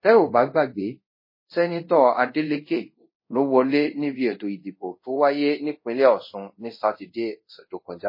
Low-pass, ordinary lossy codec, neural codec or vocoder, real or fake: 5.4 kHz; MP3, 24 kbps; codec, 24 kHz, 0.5 kbps, DualCodec; fake